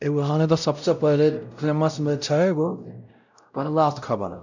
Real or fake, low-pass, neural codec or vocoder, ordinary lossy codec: fake; 7.2 kHz; codec, 16 kHz, 0.5 kbps, X-Codec, WavLM features, trained on Multilingual LibriSpeech; none